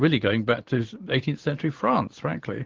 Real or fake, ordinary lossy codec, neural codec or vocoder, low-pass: fake; Opus, 16 kbps; vocoder, 44.1 kHz, 128 mel bands every 512 samples, BigVGAN v2; 7.2 kHz